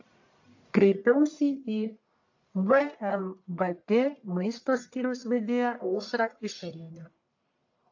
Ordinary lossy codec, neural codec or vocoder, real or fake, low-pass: AAC, 48 kbps; codec, 44.1 kHz, 1.7 kbps, Pupu-Codec; fake; 7.2 kHz